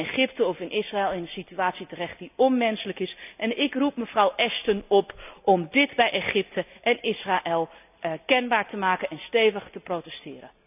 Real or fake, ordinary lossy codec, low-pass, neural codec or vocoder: real; none; 3.6 kHz; none